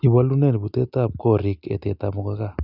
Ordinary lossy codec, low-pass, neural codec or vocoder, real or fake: none; 5.4 kHz; none; real